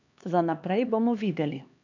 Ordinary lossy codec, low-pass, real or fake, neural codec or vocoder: none; 7.2 kHz; fake; codec, 16 kHz, 2 kbps, X-Codec, HuBERT features, trained on LibriSpeech